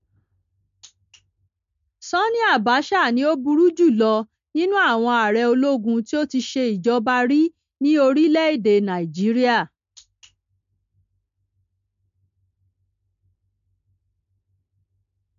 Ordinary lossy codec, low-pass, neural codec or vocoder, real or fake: MP3, 48 kbps; 7.2 kHz; none; real